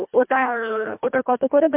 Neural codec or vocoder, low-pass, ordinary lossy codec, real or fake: codec, 24 kHz, 1.5 kbps, HILCodec; 3.6 kHz; MP3, 32 kbps; fake